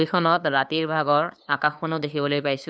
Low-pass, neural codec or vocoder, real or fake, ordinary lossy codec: none; codec, 16 kHz, 2 kbps, FunCodec, trained on LibriTTS, 25 frames a second; fake; none